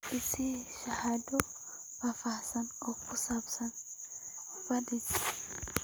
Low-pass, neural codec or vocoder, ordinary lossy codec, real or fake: none; none; none; real